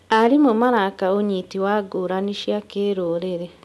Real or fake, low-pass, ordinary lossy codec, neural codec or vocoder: real; none; none; none